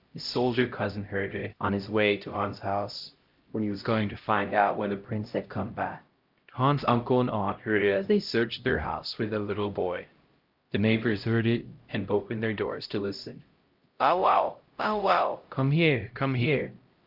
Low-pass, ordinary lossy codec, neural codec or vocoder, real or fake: 5.4 kHz; Opus, 32 kbps; codec, 16 kHz, 0.5 kbps, X-Codec, HuBERT features, trained on LibriSpeech; fake